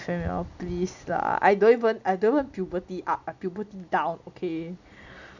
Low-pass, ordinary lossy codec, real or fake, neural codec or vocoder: 7.2 kHz; none; real; none